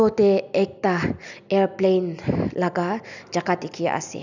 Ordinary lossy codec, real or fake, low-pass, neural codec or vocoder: none; real; 7.2 kHz; none